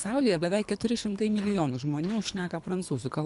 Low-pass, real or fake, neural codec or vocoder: 10.8 kHz; fake; codec, 24 kHz, 3 kbps, HILCodec